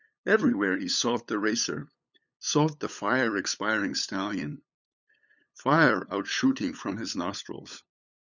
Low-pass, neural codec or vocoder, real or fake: 7.2 kHz; codec, 16 kHz, 8 kbps, FunCodec, trained on LibriTTS, 25 frames a second; fake